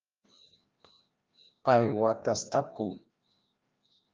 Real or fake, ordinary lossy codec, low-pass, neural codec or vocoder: fake; Opus, 24 kbps; 7.2 kHz; codec, 16 kHz, 1 kbps, FreqCodec, larger model